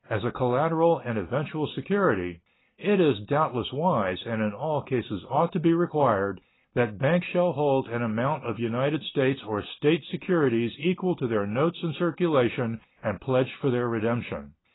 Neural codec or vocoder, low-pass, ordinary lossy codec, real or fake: none; 7.2 kHz; AAC, 16 kbps; real